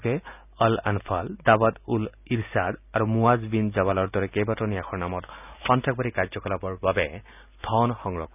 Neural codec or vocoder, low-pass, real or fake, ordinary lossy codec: none; 3.6 kHz; real; none